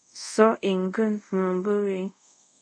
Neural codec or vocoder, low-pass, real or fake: codec, 24 kHz, 0.5 kbps, DualCodec; 9.9 kHz; fake